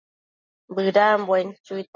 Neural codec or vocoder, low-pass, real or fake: none; 7.2 kHz; real